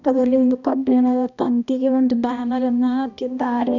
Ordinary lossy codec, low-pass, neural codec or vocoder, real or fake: none; 7.2 kHz; codec, 16 kHz, 1 kbps, X-Codec, HuBERT features, trained on balanced general audio; fake